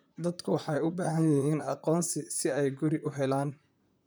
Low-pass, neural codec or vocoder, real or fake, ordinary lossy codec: none; vocoder, 44.1 kHz, 128 mel bands, Pupu-Vocoder; fake; none